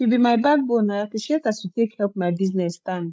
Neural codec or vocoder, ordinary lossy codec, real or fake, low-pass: codec, 16 kHz, 16 kbps, FreqCodec, larger model; none; fake; none